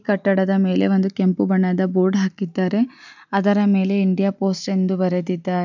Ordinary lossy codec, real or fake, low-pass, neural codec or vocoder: none; real; 7.2 kHz; none